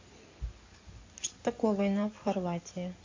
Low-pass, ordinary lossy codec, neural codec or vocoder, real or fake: 7.2 kHz; MP3, 48 kbps; codec, 16 kHz in and 24 kHz out, 2.2 kbps, FireRedTTS-2 codec; fake